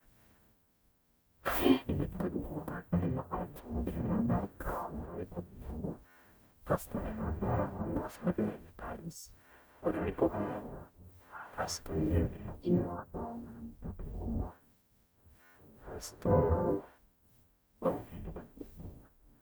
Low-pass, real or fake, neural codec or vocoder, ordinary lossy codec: none; fake; codec, 44.1 kHz, 0.9 kbps, DAC; none